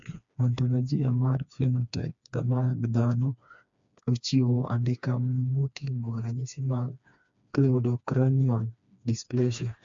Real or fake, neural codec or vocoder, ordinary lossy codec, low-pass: fake; codec, 16 kHz, 2 kbps, FreqCodec, smaller model; MP3, 64 kbps; 7.2 kHz